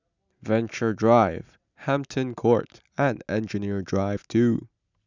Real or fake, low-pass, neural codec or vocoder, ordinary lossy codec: real; 7.2 kHz; none; none